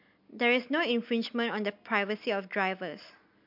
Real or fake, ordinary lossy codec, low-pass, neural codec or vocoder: real; MP3, 48 kbps; 5.4 kHz; none